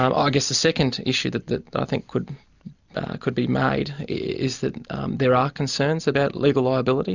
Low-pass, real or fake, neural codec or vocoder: 7.2 kHz; real; none